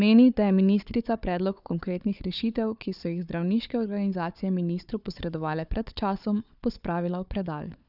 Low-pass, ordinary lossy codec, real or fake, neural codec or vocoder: 5.4 kHz; none; fake; codec, 16 kHz, 4 kbps, FunCodec, trained on Chinese and English, 50 frames a second